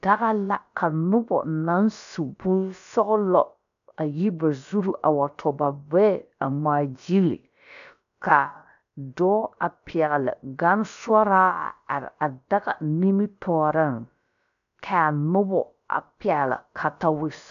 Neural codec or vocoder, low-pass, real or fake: codec, 16 kHz, about 1 kbps, DyCAST, with the encoder's durations; 7.2 kHz; fake